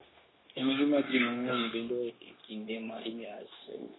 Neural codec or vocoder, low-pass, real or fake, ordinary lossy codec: codec, 16 kHz, 0.9 kbps, LongCat-Audio-Codec; 7.2 kHz; fake; AAC, 16 kbps